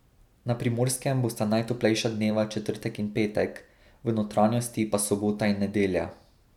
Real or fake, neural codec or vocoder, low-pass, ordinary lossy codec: real; none; 19.8 kHz; none